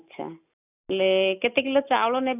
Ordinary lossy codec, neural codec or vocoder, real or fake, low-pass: none; none; real; 3.6 kHz